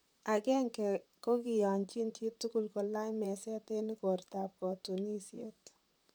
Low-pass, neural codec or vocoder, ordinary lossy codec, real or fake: none; vocoder, 44.1 kHz, 128 mel bands, Pupu-Vocoder; none; fake